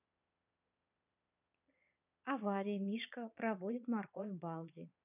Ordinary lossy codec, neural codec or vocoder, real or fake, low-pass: none; vocoder, 22.05 kHz, 80 mel bands, Vocos; fake; 3.6 kHz